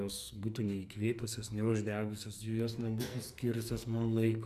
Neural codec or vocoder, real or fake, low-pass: codec, 44.1 kHz, 2.6 kbps, SNAC; fake; 14.4 kHz